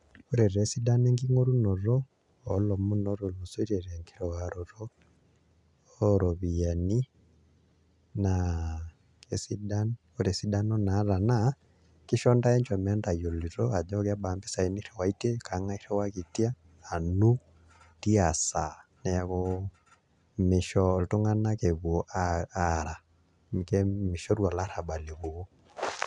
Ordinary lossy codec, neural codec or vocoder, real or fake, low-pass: none; none; real; 10.8 kHz